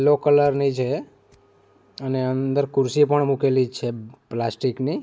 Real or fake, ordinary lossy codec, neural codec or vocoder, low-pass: real; none; none; none